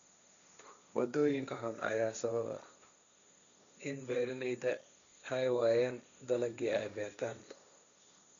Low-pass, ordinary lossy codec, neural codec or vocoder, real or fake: 7.2 kHz; none; codec, 16 kHz, 1.1 kbps, Voila-Tokenizer; fake